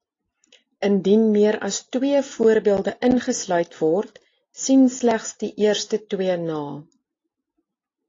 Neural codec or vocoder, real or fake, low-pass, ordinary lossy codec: none; real; 7.2 kHz; AAC, 32 kbps